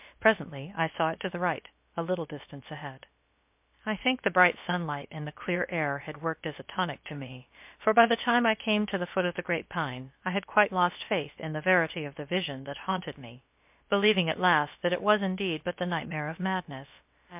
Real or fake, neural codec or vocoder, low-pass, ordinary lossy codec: fake; codec, 16 kHz, about 1 kbps, DyCAST, with the encoder's durations; 3.6 kHz; MP3, 32 kbps